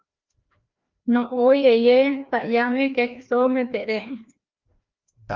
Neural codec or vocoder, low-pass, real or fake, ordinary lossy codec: codec, 16 kHz, 1 kbps, FreqCodec, larger model; 7.2 kHz; fake; Opus, 24 kbps